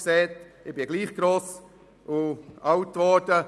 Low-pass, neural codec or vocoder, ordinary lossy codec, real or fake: none; none; none; real